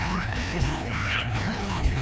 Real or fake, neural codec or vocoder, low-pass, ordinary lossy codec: fake; codec, 16 kHz, 1 kbps, FreqCodec, larger model; none; none